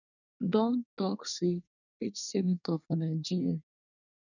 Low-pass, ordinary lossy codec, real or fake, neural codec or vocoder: 7.2 kHz; none; fake; codec, 24 kHz, 1 kbps, SNAC